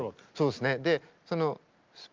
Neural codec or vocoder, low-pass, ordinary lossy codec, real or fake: none; 7.2 kHz; Opus, 24 kbps; real